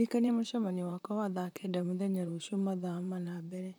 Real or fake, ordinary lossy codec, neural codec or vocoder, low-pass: fake; none; vocoder, 44.1 kHz, 128 mel bands, Pupu-Vocoder; none